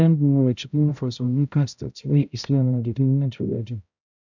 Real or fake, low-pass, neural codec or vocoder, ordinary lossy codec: fake; 7.2 kHz; codec, 16 kHz, 0.5 kbps, X-Codec, HuBERT features, trained on balanced general audio; none